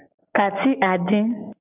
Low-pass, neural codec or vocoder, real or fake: 3.6 kHz; none; real